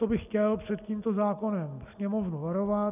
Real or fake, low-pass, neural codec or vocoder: real; 3.6 kHz; none